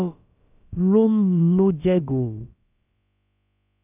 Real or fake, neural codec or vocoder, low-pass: fake; codec, 16 kHz, about 1 kbps, DyCAST, with the encoder's durations; 3.6 kHz